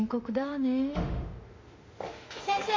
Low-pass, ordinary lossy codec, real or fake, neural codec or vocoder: 7.2 kHz; MP3, 48 kbps; fake; autoencoder, 48 kHz, 32 numbers a frame, DAC-VAE, trained on Japanese speech